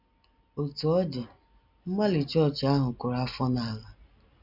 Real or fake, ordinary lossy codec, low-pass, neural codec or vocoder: real; none; 5.4 kHz; none